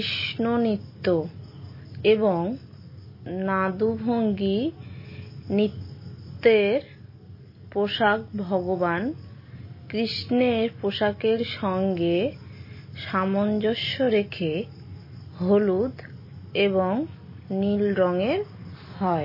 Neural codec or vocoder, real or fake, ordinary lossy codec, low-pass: none; real; MP3, 24 kbps; 5.4 kHz